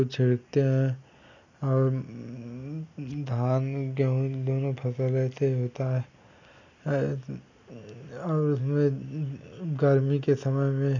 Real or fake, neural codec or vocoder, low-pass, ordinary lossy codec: real; none; 7.2 kHz; none